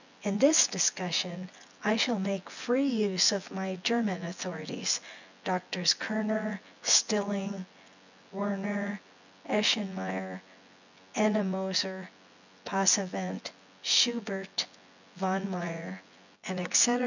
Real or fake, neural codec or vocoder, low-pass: fake; vocoder, 24 kHz, 100 mel bands, Vocos; 7.2 kHz